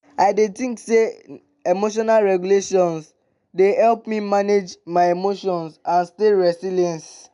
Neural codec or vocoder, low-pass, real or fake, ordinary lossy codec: none; 10.8 kHz; real; none